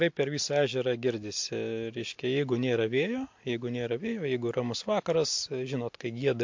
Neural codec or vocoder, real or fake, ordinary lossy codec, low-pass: none; real; MP3, 48 kbps; 7.2 kHz